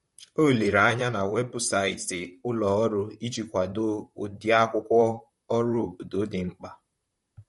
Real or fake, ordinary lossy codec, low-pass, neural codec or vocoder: fake; MP3, 48 kbps; 19.8 kHz; vocoder, 44.1 kHz, 128 mel bands, Pupu-Vocoder